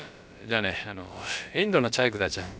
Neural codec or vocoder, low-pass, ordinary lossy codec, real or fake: codec, 16 kHz, about 1 kbps, DyCAST, with the encoder's durations; none; none; fake